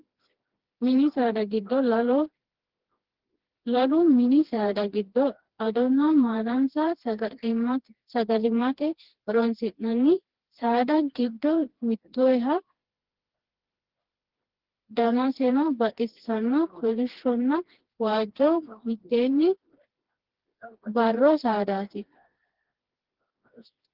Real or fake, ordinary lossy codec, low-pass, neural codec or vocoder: fake; Opus, 16 kbps; 5.4 kHz; codec, 16 kHz, 2 kbps, FreqCodec, smaller model